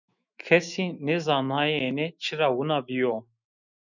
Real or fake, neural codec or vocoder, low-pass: fake; autoencoder, 48 kHz, 128 numbers a frame, DAC-VAE, trained on Japanese speech; 7.2 kHz